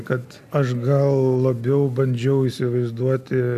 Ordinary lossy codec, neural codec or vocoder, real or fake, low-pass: AAC, 96 kbps; none; real; 14.4 kHz